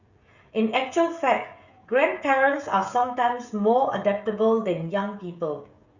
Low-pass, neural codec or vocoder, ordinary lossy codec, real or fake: 7.2 kHz; codec, 16 kHz, 16 kbps, FreqCodec, smaller model; Opus, 64 kbps; fake